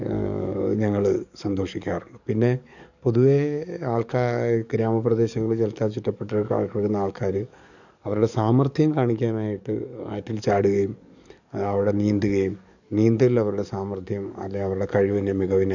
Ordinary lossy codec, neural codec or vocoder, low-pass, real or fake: none; codec, 44.1 kHz, 7.8 kbps, DAC; 7.2 kHz; fake